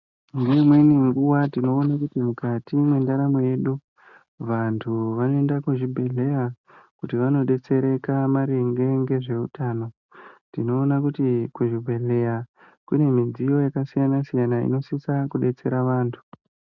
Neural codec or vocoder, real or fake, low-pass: none; real; 7.2 kHz